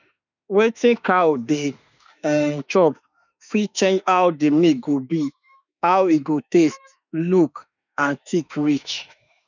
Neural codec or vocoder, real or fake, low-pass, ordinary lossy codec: autoencoder, 48 kHz, 32 numbers a frame, DAC-VAE, trained on Japanese speech; fake; 7.2 kHz; none